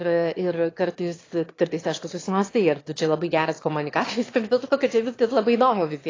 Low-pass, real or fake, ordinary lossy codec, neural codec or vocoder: 7.2 kHz; fake; AAC, 32 kbps; autoencoder, 22.05 kHz, a latent of 192 numbers a frame, VITS, trained on one speaker